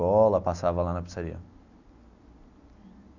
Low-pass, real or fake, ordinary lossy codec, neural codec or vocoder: 7.2 kHz; real; none; none